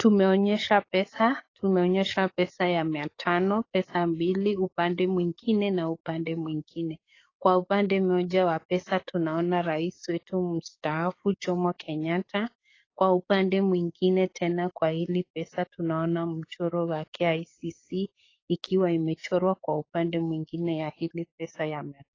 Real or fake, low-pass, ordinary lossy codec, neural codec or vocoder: fake; 7.2 kHz; AAC, 32 kbps; codec, 44.1 kHz, 7.8 kbps, DAC